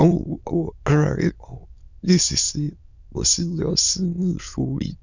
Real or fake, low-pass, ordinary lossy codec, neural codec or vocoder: fake; 7.2 kHz; none; autoencoder, 22.05 kHz, a latent of 192 numbers a frame, VITS, trained on many speakers